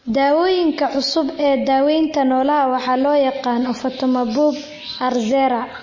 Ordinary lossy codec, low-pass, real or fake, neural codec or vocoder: MP3, 32 kbps; 7.2 kHz; real; none